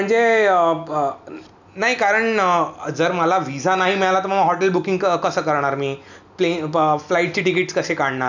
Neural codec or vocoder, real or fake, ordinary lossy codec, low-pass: none; real; none; 7.2 kHz